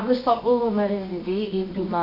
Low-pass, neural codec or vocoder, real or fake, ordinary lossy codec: 5.4 kHz; codec, 24 kHz, 0.9 kbps, WavTokenizer, medium music audio release; fake; none